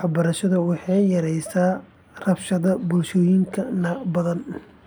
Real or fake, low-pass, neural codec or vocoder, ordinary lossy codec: real; none; none; none